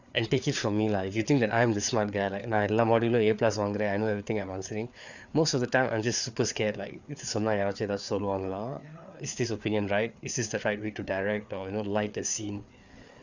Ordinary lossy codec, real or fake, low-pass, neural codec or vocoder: none; fake; 7.2 kHz; codec, 16 kHz, 4 kbps, FreqCodec, larger model